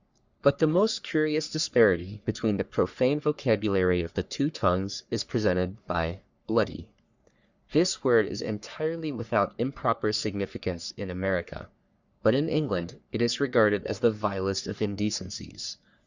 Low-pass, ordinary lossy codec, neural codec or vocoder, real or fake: 7.2 kHz; Opus, 64 kbps; codec, 44.1 kHz, 3.4 kbps, Pupu-Codec; fake